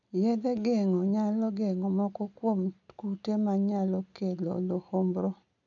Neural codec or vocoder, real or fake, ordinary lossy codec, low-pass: none; real; none; 7.2 kHz